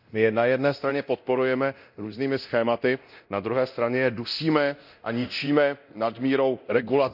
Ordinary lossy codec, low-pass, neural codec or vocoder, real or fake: none; 5.4 kHz; codec, 24 kHz, 0.9 kbps, DualCodec; fake